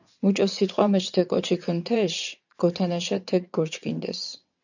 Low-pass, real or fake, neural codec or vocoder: 7.2 kHz; fake; vocoder, 22.05 kHz, 80 mel bands, WaveNeXt